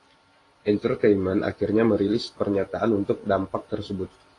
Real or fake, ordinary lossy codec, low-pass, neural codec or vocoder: fake; AAC, 32 kbps; 10.8 kHz; vocoder, 44.1 kHz, 128 mel bands every 512 samples, BigVGAN v2